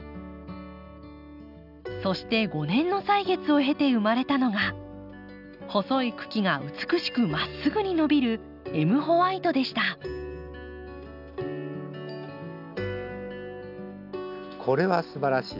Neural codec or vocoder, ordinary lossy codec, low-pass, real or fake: none; none; 5.4 kHz; real